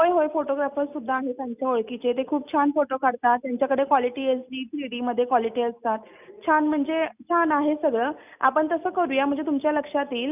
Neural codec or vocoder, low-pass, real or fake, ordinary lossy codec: none; 3.6 kHz; real; none